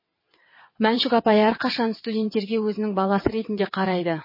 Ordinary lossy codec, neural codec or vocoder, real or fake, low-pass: MP3, 24 kbps; vocoder, 22.05 kHz, 80 mel bands, WaveNeXt; fake; 5.4 kHz